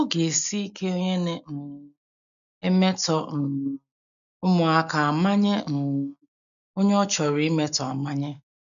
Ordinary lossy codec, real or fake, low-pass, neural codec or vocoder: none; real; 7.2 kHz; none